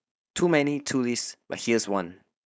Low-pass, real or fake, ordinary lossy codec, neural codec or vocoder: none; fake; none; codec, 16 kHz, 4.8 kbps, FACodec